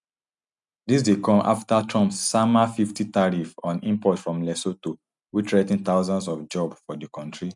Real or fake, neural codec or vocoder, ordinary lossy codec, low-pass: real; none; none; 10.8 kHz